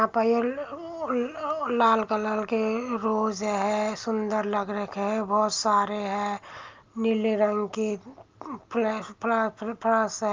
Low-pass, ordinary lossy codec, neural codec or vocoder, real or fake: 7.2 kHz; Opus, 24 kbps; none; real